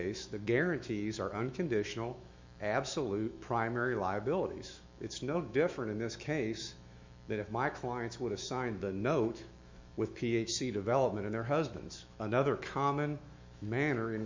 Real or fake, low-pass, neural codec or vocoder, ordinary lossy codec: fake; 7.2 kHz; autoencoder, 48 kHz, 128 numbers a frame, DAC-VAE, trained on Japanese speech; MP3, 64 kbps